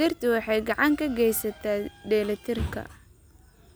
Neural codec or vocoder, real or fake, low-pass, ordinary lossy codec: none; real; none; none